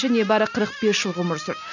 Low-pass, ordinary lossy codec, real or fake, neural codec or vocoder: 7.2 kHz; none; real; none